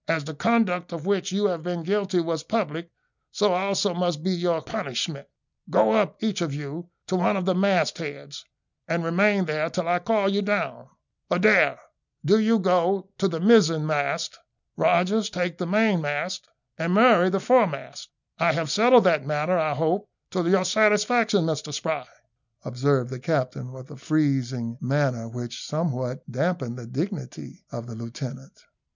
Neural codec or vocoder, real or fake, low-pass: none; real; 7.2 kHz